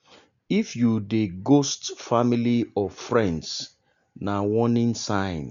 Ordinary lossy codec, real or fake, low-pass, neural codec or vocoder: none; real; 7.2 kHz; none